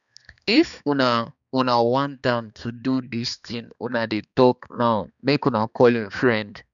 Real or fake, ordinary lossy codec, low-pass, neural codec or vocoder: fake; none; 7.2 kHz; codec, 16 kHz, 2 kbps, X-Codec, HuBERT features, trained on balanced general audio